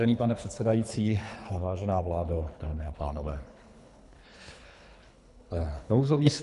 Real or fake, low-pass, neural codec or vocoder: fake; 10.8 kHz; codec, 24 kHz, 3 kbps, HILCodec